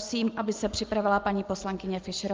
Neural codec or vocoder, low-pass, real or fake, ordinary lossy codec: none; 7.2 kHz; real; Opus, 32 kbps